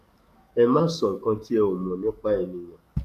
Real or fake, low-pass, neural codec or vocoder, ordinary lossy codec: fake; 14.4 kHz; codec, 44.1 kHz, 7.8 kbps, DAC; none